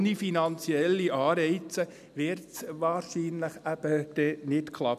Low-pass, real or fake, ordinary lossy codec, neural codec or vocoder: 14.4 kHz; real; none; none